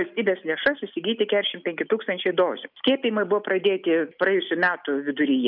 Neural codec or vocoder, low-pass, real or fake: none; 5.4 kHz; real